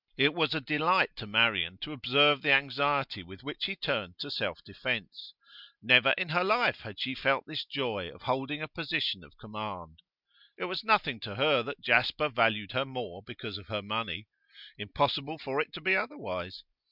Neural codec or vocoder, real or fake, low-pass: none; real; 5.4 kHz